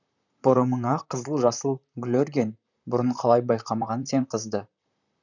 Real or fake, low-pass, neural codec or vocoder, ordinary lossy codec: fake; 7.2 kHz; vocoder, 44.1 kHz, 128 mel bands, Pupu-Vocoder; none